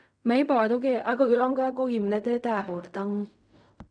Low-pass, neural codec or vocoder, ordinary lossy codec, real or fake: 9.9 kHz; codec, 16 kHz in and 24 kHz out, 0.4 kbps, LongCat-Audio-Codec, fine tuned four codebook decoder; none; fake